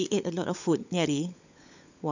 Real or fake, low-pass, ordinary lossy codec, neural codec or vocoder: fake; 7.2 kHz; none; codec, 16 kHz, 16 kbps, FunCodec, trained on LibriTTS, 50 frames a second